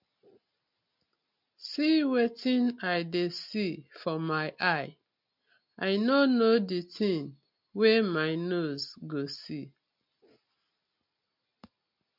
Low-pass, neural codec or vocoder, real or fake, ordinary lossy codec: 5.4 kHz; none; real; MP3, 48 kbps